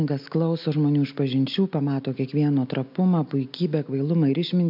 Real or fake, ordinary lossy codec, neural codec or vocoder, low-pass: real; MP3, 48 kbps; none; 5.4 kHz